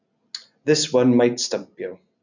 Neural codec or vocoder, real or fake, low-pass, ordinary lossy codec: none; real; 7.2 kHz; none